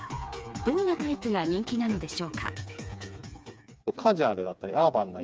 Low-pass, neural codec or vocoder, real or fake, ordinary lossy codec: none; codec, 16 kHz, 4 kbps, FreqCodec, smaller model; fake; none